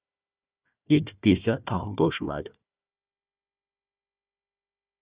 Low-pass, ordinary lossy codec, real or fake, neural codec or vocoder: 3.6 kHz; Opus, 64 kbps; fake; codec, 16 kHz, 1 kbps, FunCodec, trained on Chinese and English, 50 frames a second